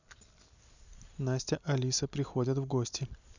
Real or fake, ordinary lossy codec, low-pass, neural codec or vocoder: real; none; 7.2 kHz; none